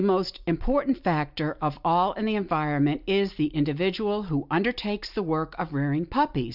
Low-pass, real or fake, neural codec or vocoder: 5.4 kHz; real; none